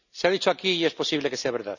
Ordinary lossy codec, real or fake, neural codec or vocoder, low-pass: none; real; none; 7.2 kHz